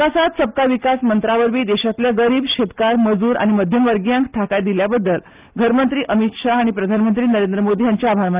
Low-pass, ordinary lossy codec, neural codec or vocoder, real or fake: 3.6 kHz; Opus, 32 kbps; none; real